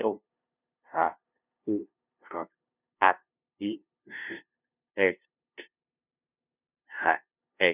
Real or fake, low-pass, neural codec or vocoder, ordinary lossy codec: fake; 3.6 kHz; codec, 16 kHz, 0.5 kbps, FunCodec, trained on LibriTTS, 25 frames a second; none